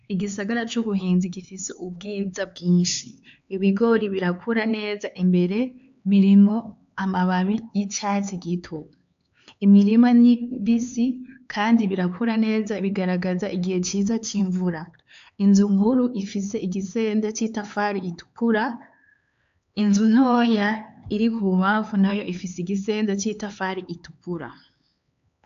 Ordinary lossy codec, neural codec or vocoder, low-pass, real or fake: AAC, 64 kbps; codec, 16 kHz, 4 kbps, X-Codec, HuBERT features, trained on LibriSpeech; 7.2 kHz; fake